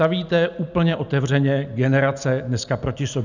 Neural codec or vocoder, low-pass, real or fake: none; 7.2 kHz; real